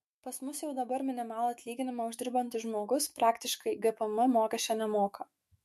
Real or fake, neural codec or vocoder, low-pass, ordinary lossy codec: fake; autoencoder, 48 kHz, 128 numbers a frame, DAC-VAE, trained on Japanese speech; 14.4 kHz; MP3, 64 kbps